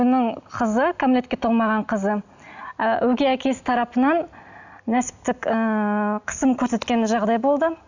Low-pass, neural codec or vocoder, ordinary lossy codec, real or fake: 7.2 kHz; none; none; real